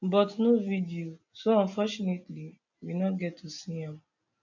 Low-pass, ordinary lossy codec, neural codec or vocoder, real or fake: 7.2 kHz; none; none; real